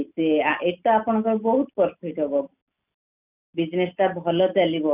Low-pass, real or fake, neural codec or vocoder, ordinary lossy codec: 3.6 kHz; real; none; none